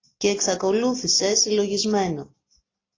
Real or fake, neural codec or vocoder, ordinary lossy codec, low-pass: real; none; AAC, 32 kbps; 7.2 kHz